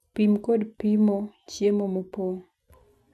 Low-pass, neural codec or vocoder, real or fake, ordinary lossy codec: none; none; real; none